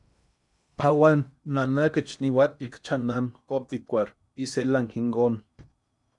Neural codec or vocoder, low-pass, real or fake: codec, 16 kHz in and 24 kHz out, 0.8 kbps, FocalCodec, streaming, 65536 codes; 10.8 kHz; fake